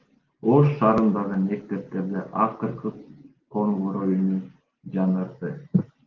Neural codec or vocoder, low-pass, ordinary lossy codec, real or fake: none; 7.2 kHz; Opus, 32 kbps; real